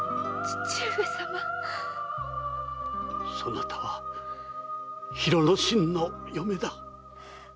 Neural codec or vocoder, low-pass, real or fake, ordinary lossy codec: none; none; real; none